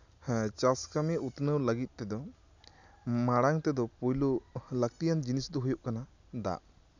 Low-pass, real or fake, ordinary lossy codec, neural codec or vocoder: 7.2 kHz; real; none; none